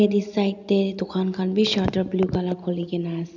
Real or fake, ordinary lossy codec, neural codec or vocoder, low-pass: real; none; none; 7.2 kHz